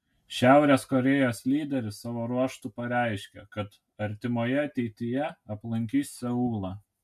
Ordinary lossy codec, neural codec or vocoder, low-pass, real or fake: MP3, 96 kbps; none; 14.4 kHz; real